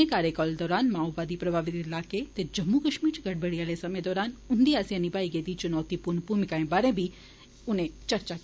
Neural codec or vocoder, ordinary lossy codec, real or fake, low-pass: none; none; real; none